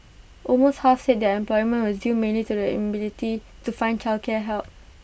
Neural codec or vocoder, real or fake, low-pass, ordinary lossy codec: none; real; none; none